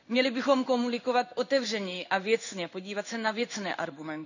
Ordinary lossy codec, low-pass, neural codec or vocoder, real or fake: none; 7.2 kHz; codec, 16 kHz in and 24 kHz out, 1 kbps, XY-Tokenizer; fake